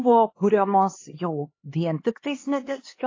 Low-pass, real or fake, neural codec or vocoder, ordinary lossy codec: 7.2 kHz; fake; codec, 16 kHz, 2 kbps, X-Codec, HuBERT features, trained on LibriSpeech; AAC, 32 kbps